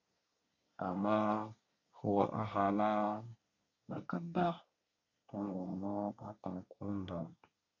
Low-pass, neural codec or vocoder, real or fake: 7.2 kHz; codec, 24 kHz, 1 kbps, SNAC; fake